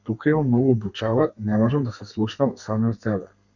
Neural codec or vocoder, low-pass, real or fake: codec, 32 kHz, 1.9 kbps, SNAC; 7.2 kHz; fake